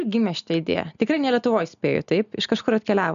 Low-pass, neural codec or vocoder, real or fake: 7.2 kHz; none; real